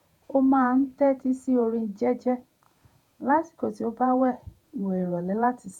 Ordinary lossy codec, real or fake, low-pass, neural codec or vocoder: none; fake; 19.8 kHz; vocoder, 48 kHz, 128 mel bands, Vocos